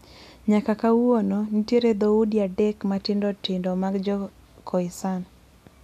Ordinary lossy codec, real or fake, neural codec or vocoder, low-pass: none; real; none; 14.4 kHz